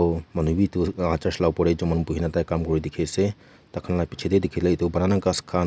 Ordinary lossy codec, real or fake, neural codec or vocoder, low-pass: none; real; none; none